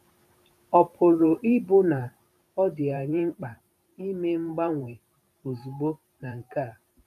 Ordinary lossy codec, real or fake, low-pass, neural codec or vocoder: none; fake; 14.4 kHz; vocoder, 44.1 kHz, 128 mel bands every 512 samples, BigVGAN v2